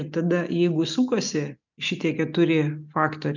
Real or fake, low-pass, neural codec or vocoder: real; 7.2 kHz; none